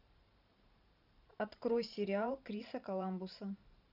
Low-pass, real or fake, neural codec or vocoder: 5.4 kHz; fake; vocoder, 44.1 kHz, 128 mel bands every 512 samples, BigVGAN v2